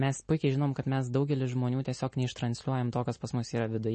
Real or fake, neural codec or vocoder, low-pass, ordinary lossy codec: real; none; 9.9 kHz; MP3, 32 kbps